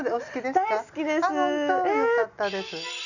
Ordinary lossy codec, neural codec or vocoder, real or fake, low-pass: none; none; real; 7.2 kHz